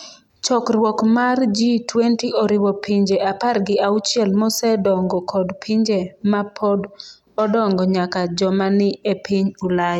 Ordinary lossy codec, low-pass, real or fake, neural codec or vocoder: none; 19.8 kHz; real; none